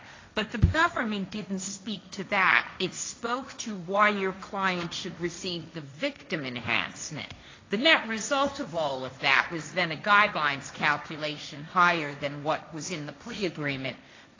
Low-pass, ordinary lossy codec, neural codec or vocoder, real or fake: 7.2 kHz; AAC, 32 kbps; codec, 16 kHz, 1.1 kbps, Voila-Tokenizer; fake